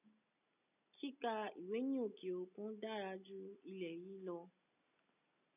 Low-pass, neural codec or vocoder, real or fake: 3.6 kHz; none; real